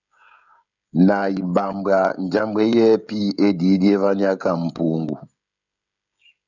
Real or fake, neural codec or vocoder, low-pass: fake; codec, 16 kHz, 16 kbps, FreqCodec, smaller model; 7.2 kHz